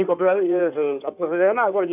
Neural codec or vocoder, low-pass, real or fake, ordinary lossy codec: codec, 16 kHz in and 24 kHz out, 2.2 kbps, FireRedTTS-2 codec; 3.6 kHz; fake; none